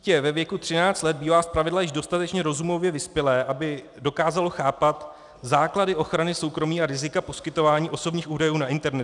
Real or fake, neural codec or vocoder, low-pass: real; none; 10.8 kHz